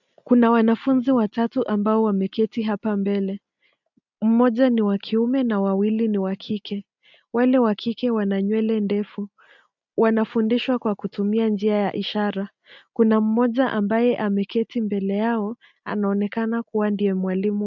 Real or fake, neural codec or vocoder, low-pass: real; none; 7.2 kHz